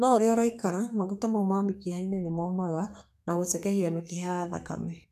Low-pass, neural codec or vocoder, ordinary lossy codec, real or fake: 14.4 kHz; codec, 32 kHz, 1.9 kbps, SNAC; none; fake